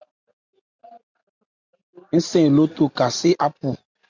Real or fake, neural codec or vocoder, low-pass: real; none; 7.2 kHz